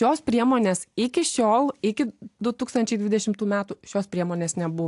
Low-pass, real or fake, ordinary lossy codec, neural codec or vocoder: 10.8 kHz; real; AAC, 64 kbps; none